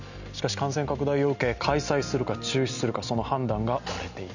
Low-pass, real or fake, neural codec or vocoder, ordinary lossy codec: 7.2 kHz; real; none; none